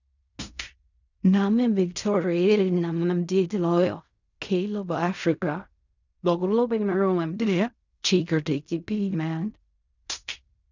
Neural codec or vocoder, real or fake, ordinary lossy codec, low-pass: codec, 16 kHz in and 24 kHz out, 0.4 kbps, LongCat-Audio-Codec, fine tuned four codebook decoder; fake; none; 7.2 kHz